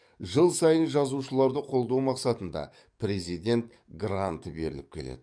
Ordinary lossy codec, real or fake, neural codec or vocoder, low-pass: none; fake; codec, 44.1 kHz, 7.8 kbps, DAC; 9.9 kHz